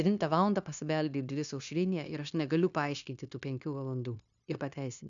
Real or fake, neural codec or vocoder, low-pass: fake; codec, 16 kHz, 0.9 kbps, LongCat-Audio-Codec; 7.2 kHz